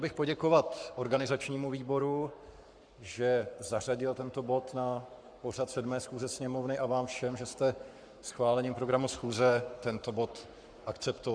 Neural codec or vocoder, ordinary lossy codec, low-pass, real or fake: codec, 44.1 kHz, 7.8 kbps, Pupu-Codec; Opus, 64 kbps; 9.9 kHz; fake